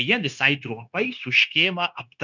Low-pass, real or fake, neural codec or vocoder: 7.2 kHz; fake; codec, 16 kHz, 0.9 kbps, LongCat-Audio-Codec